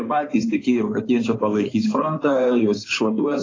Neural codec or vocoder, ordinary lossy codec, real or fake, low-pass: codec, 16 kHz in and 24 kHz out, 2.2 kbps, FireRedTTS-2 codec; AAC, 32 kbps; fake; 7.2 kHz